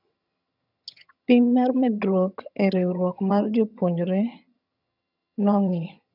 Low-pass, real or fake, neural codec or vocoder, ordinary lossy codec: 5.4 kHz; fake; vocoder, 22.05 kHz, 80 mel bands, HiFi-GAN; none